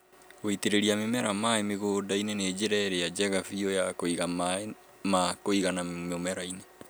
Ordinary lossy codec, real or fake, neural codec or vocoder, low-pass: none; real; none; none